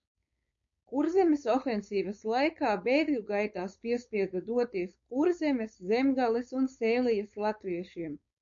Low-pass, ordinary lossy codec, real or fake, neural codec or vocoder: 7.2 kHz; MP3, 48 kbps; fake; codec, 16 kHz, 4.8 kbps, FACodec